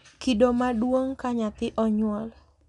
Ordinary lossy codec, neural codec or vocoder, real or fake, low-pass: none; none; real; 10.8 kHz